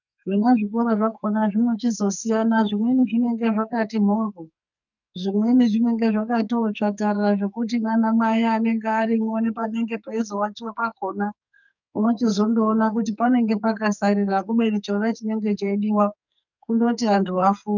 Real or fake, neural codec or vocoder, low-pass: fake; codec, 44.1 kHz, 2.6 kbps, SNAC; 7.2 kHz